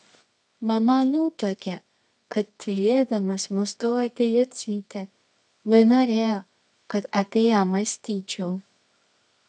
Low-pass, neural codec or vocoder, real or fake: 10.8 kHz; codec, 24 kHz, 0.9 kbps, WavTokenizer, medium music audio release; fake